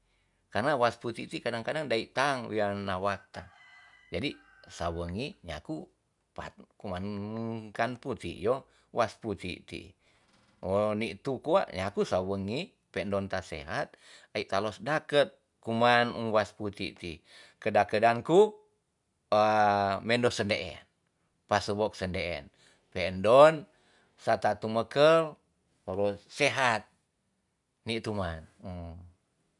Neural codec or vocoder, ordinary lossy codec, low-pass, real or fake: none; none; 10.8 kHz; real